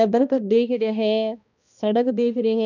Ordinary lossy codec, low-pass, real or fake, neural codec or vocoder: none; 7.2 kHz; fake; codec, 16 kHz, 1 kbps, X-Codec, HuBERT features, trained on balanced general audio